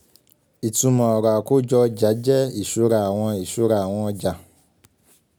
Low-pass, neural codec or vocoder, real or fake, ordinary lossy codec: none; none; real; none